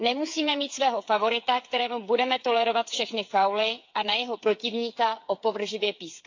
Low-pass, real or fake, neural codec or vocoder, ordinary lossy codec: 7.2 kHz; fake; codec, 16 kHz, 8 kbps, FreqCodec, smaller model; AAC, 48 kbps